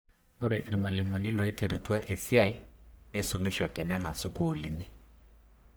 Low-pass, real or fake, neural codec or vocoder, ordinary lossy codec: none; fake; codec, 44.1 kHz, 1.7 kbps, Pupu-Codec; none